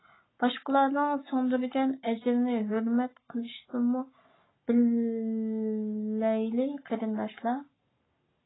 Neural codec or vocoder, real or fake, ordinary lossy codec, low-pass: codec, 44.1 kHz, 7.8 kbps, Pupu-Codec; fake; AAC, 16 kbps; 7.2 kHz